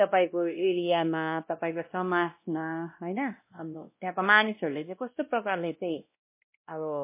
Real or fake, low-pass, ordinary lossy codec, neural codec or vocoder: fake; 3.6 kHz; MP3, 24 kbps; codec, 16 kHz, 1 kbps, X-Codec, WavLM features, trained on Multilingual LibriSpeech